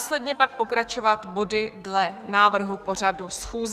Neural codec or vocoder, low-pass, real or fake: codec, 44.1 kHz, 2.6 kbps, SNAC; 14.4 kHz; fake